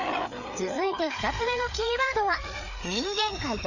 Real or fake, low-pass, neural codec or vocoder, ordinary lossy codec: fake; 7.2 kHz; codec, 16 kHz, 4 kbps, FreqCodec, larger model; none